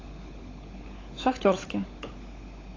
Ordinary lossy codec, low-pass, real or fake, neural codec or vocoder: AAC, 32 kbps; 7.2 kHz; fake; codec, 16 kHz, 16 kbps, FunCodec, trained on LibriTTS, 50 frames a second